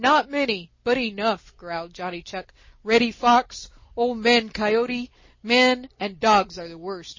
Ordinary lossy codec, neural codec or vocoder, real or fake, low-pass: MP3, 32 kbps; none; real; 7.2 kHz